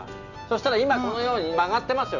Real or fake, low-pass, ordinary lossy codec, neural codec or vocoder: real; 7.2 kHz; none; none